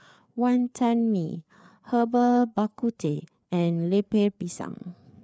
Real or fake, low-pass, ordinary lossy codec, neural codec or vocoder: fake; none; none; codec, 16 kHz, 4 kbps, FreqCodec, larger model